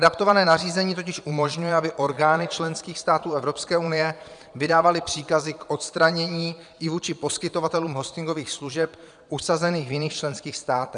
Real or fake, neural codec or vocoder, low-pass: fake; vocoder, 22.05 kHz, 80 mel bands, Vocos; 9.9 kHz